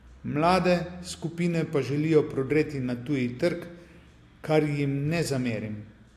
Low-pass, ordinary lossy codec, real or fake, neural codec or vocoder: 14.4 kHz; AAC, 64 kbps; real; none